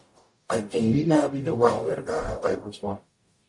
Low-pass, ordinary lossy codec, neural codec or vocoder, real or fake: 10.8 kHz; MP3, 48 kbps; codec, 44.1 kHz, 0.9 kbps, DAC; fake